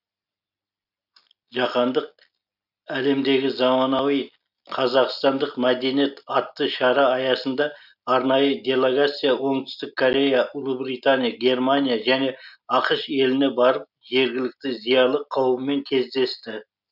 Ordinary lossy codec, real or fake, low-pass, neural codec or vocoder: none; real; 5.4 kHz; none